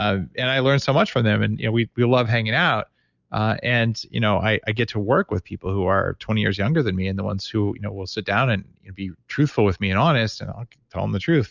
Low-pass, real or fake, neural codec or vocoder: 7.2 kHz; real; none